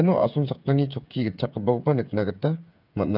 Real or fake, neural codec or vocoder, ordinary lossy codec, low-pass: fake; codec, 16 kHz, 8 kbps, FreqCodec, smaller model; none; 5.4 kHz